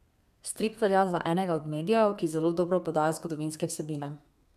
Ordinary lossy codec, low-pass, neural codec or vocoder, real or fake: none; 14.4 kHz; codec, 32 kHz, 1.9 kbps, SNAC; fake